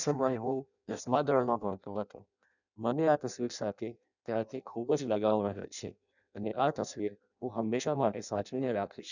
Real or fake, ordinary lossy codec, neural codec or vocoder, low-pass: fake; none; codec, 16 kHz in and 24 kHz out, 0.6 kbps, FireRedTTS-2 codec; 7.2 kHz